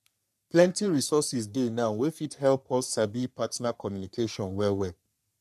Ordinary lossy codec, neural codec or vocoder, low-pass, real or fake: none; codec, 44.1 kHz, 3.4 kbps, Pupu-Codec; 14.4 kHz; fake